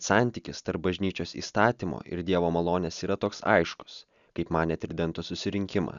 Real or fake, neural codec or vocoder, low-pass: real; none; 7.2 kHz